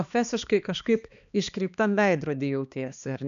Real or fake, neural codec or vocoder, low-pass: fake; codec, 16 kHz, 2 kbps, X-Codec, HuBERT features, trained on balanced general audio; 7.2 kHz